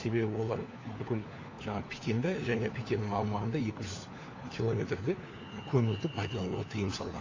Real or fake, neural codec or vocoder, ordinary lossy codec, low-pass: fake; codec, 16 kHz, 4 kbps, FunCodec, trained on LibriTTS, 50 frames a second; AAC, 32 kbps; 7.2 kHz